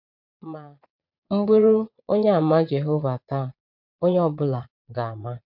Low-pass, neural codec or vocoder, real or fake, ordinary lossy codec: 5.4 kHz; vocoder, 22.05 kHz, 80 mel bands, Vocos; fake; MP3, 48 kbps